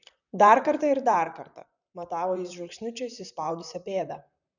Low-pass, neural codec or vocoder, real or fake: 7.2 kHz; vocoder, 44.1 kHz, 128 mel bands, Pupu-Vocoder; fake